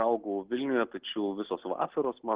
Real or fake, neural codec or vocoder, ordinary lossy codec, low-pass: real; none; Opus, 16 kbps; 3.6 kHz